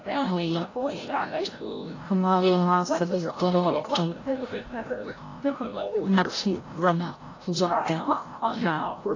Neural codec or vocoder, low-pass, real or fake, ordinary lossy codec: codec, 16 kHz, 0.5 kbps, FreqCodec, larger model; 7.2 kHz; fake; AAC, 32 kbps